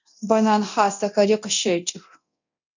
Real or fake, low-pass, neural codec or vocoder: fake; 7.2 kHz; codec, 24 kHz, 0.9 kbps, DualCodec